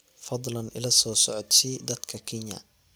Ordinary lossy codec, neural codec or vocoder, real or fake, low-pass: none; none; real; none